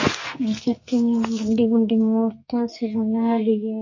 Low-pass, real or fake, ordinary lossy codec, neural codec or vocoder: 7.2 kHz; fake; MP3, 32 kbps; codec, 44.1 kHz, 2.6 kbps, SNAC